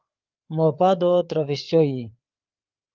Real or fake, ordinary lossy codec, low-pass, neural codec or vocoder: fake; Opus, 32 kbps; 7.2 kHz; codec, 16 kHz, 4 kbps, FreqCodec, larger model